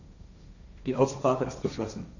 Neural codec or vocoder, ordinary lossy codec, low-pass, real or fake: codec, 16 kHz, 1.1 kbps, Voila-Tokenizer; none; 7.2 kHz; fake